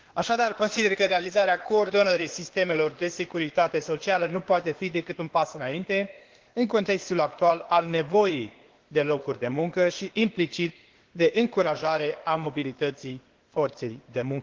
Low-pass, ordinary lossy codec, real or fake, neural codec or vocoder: 7.2 kHz; Opus, 16 kbps; fake; codec, 16 kHz, 0.8 kbps, ZipCodec